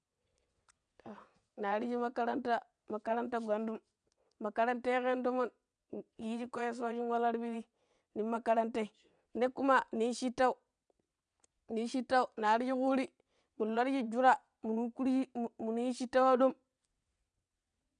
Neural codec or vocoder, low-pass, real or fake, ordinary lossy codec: vocoder, 22.05 kHz, 80 mel bands, WaveNeXt; 9.9 kHz; fake; none